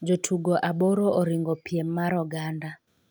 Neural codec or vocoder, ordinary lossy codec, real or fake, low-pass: none; none; real; none